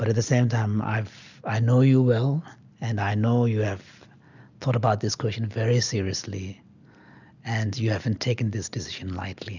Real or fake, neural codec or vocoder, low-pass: real; none; 7.2 kHz